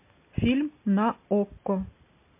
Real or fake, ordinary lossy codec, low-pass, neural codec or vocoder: real; MP3, 24 kbps; 3.6 kHz; none